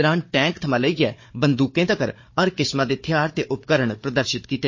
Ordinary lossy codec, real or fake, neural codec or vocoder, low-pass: MP3, 32 kbps; fake; codec, 16 kHz, 4 kbps, FunCodec, trained on Chinese and English, 50 frames a second; 7.2 kHz